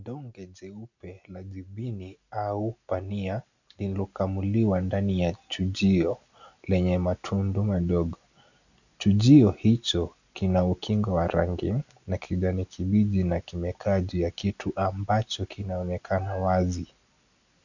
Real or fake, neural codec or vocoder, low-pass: real; none; 7.2 kHz